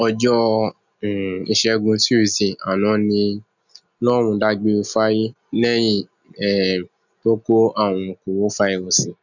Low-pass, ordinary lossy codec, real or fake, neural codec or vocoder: 7.2 kHz; none; real; none